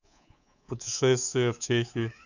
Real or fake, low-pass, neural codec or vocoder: fake; 7.2 kHz; codec, 24 kHz, 3.1 kbps, DualCodec